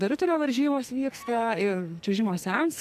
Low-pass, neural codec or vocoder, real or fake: 14.4 kHz; codec, 44.1 kHz, 2.6 kbps, SNAC; fake